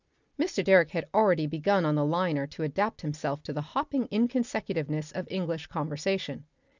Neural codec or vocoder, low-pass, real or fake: none; 7.2 kHz; real